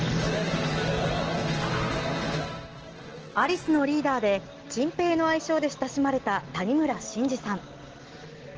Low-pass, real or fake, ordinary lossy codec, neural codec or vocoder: 7.2 kHz; real; Opus, 16 kbps; none